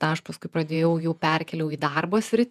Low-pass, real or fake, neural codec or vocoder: 14.4 kHz; fake; vocoder, 48 kHz, 128 mel bands, Vocos